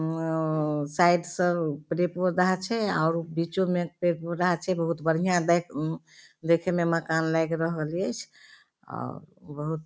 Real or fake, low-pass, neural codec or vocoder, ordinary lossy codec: real; none; none; none